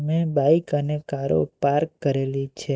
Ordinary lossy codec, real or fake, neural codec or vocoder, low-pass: none; real; none; none